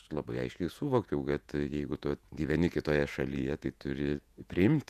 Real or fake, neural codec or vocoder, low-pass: fake; vocoder, 48 kHz, 128 mel bands, Vocos; 14.4 kHz